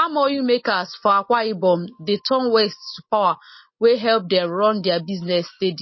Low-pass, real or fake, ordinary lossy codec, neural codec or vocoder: 7.2 kHz; real; MP3, 24 kbps; none